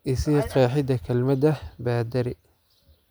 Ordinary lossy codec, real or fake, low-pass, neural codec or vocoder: none; real; none; none